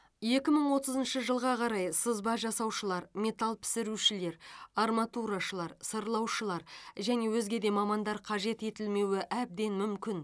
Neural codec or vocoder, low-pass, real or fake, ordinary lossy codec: none; none; real; none